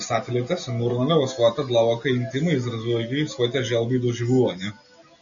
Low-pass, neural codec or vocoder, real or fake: 7.2 kHz; none; real